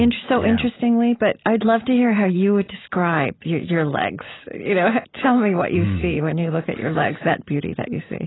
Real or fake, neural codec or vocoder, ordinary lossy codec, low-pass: real; none; AAC, 16 kbps; 7.2 kHz